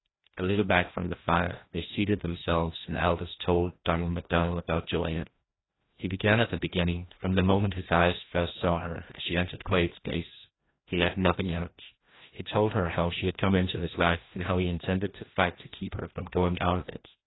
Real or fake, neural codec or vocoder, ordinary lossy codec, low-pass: fake; codec, 16 kHz, 1 kbps, FreqCodec, larger model; AAC, 16 kbps; 7.2 kHz